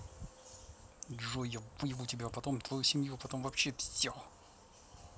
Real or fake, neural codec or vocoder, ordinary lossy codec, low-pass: real; none; none; none